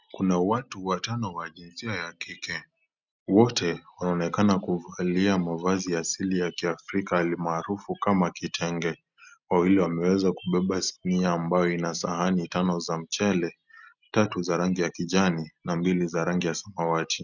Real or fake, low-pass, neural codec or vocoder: real; 7.2 kHz; none